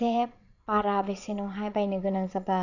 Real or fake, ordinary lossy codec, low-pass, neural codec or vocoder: fake; none; 7.2 kHz; vocoder, 22.05 kHz, 80 mel bands, Vocos